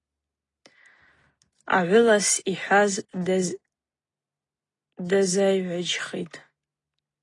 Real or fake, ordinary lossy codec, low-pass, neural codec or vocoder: real; AAC, 32 kbps; 10.8 kHz; none